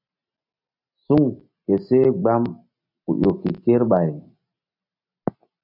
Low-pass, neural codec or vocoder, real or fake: 5.4 kHz; none; real